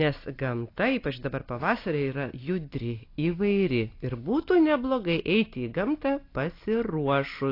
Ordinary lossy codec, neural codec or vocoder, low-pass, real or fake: AAC, 32 kbps; none; 5.4 kHz; real